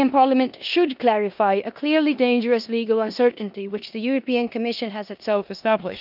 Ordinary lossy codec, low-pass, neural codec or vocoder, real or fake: none; 5.4 kHz; codec, 16 kHz in and 24 kHz out, 0.9 kbps, LongCat-Audio-Codec, four codebook decoder; fake